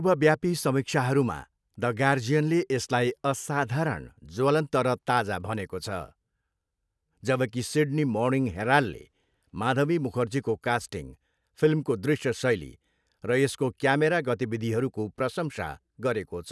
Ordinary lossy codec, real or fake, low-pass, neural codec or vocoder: none; real; none; none